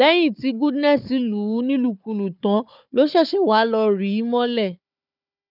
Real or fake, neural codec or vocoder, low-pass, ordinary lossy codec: fake; codec, 16 kHz, 4 kbps, FunCodec, trained on Chinese and English, 50 frames a second; 5.4 kHz; none